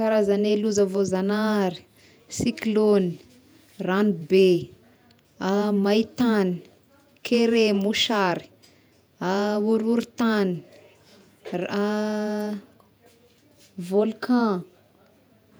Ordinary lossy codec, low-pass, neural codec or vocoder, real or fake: none; none; vocoder, 48 kHz, 128 mel bands, Vocos; fake